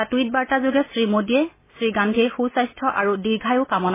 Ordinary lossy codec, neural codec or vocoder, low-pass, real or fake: MP3, 16 kbps; none; 3.6 kHz; real